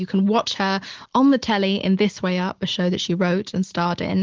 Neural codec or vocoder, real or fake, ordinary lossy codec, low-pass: none; real; Opus, 16 kbps; 7.2 kHz